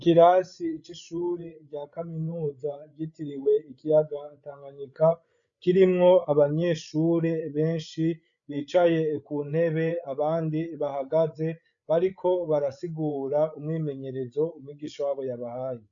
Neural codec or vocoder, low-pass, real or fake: codec, 16 kHz, 8 kbps, FreqCodec, larger model; 7.2 kHz; fake